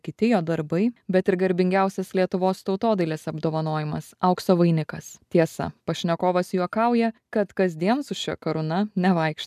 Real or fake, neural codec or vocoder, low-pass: real; none; 14.4 kHz